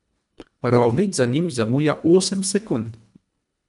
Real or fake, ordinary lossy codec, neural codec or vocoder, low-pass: fake; none; codec, 24 kHz, 1.5 kbps, HILCodec; 10.8 kHz